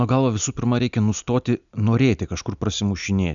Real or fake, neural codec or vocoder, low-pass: real; none; 7.2 kHz